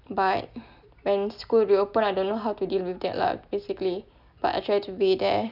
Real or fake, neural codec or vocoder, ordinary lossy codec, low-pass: real; none; none; 5.4 kHz